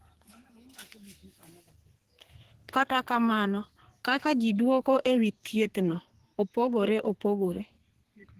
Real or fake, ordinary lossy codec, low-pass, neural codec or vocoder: fake; Opus, 16 kbps; 14.4 kHz; codec, 32 kHz, 1.9 kbps, SNAC